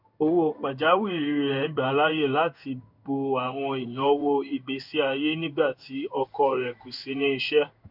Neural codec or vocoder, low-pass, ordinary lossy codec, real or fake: codec, 16 kHz in and 24 kHz out, 1 kbps, XY-Tokenizer; 5.4 kHz; none; fake